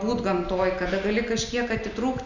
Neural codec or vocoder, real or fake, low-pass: none; real; 7.2 kHz